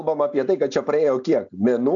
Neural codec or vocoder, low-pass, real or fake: none; 7.2 kHz; real